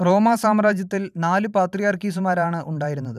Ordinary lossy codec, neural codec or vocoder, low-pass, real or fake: none; vocoder, 44.1 kHz, 128 mel bands every 512 samples, BigVGAN v2; 14.4 kHz; fake